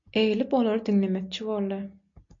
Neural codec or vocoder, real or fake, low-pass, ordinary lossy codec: none; real; 7.2 kHz; MP3, 48 kbps